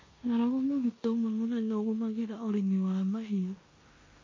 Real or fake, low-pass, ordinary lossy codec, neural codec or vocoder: fake; 7.2 kHz; MP3, 32 kbps; codec, 16 kHz in and 24 kHz out, 0.9 kbps, LongCat-Audio-Codec, four codebook decoder